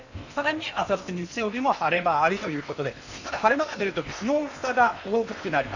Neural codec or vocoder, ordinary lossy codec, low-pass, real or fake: codec, 16 kHz in and 24 kHz out, 0.8 kbps, FocalCodec, streaming, 65536 codes; none; 7.2 kHz; fake